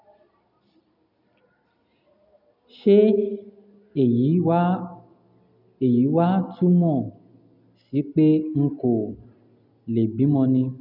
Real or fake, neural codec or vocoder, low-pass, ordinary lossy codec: real; none; 5.4 kHz; none